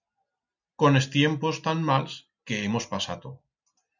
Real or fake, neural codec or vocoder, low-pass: real; none; 7.2 kHz